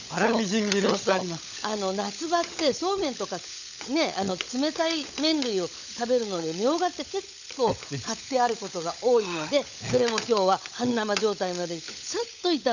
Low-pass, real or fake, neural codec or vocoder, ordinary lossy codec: 7.2 kHz; fake; codec, 16 kHz, 16 kbps, FunCodec, trained on LibriTTS, 50 frames a second; none